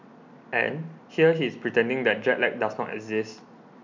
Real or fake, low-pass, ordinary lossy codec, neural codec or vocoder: real; 7.2 kHz; MP3, 64 kbps; none